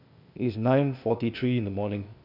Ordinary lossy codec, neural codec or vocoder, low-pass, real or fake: none; codec, 16 kHz, 0.8 kbps, ZipCodec; 5.4 kHz; fake